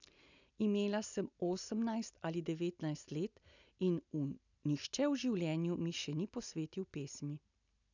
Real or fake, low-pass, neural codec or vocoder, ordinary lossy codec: real; 7.2 kHz; none; none